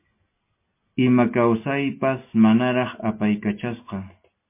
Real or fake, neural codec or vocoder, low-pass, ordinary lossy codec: real; none; 3.6 kHz; MP3, 24 kbps